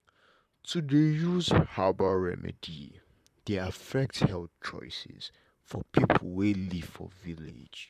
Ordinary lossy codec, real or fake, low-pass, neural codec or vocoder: none; fake; 14.4 kHz; vocoder, 44.1 kHz, 128 mel bands, Pupu-Vocoder